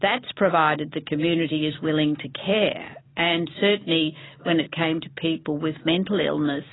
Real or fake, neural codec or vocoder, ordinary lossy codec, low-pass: real; none; AAC, 16 kbps; 7.2 kHz